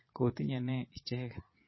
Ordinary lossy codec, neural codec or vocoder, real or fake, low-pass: MP3, 24 kbps; vocoder, 22.05 kHz, 80 mel bands, Vocos; fake; 7.2 kHz